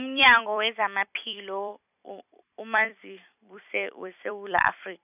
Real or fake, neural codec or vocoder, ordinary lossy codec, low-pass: fake; vocoder, 44.1 kHz, 128 mel bands every 256 samples, BigVGAN v2; none; 3.6 kHz